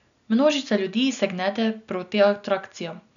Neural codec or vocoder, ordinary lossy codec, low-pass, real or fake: none; MP3, 96 kbps; 7.2 kHz; real